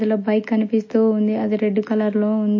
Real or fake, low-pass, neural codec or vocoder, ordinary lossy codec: real; 7.2 kHz; none; MP3, 32 kbps